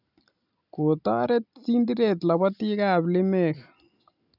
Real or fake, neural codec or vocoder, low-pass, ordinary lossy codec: real; none; 5.4 kHz; none